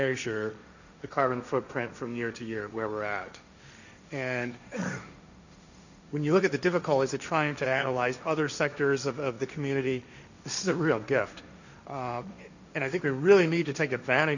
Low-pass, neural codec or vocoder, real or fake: 7.2 kHz; codec, 16 kHz, 1.1 kbps, Voila-Tokenizer; fake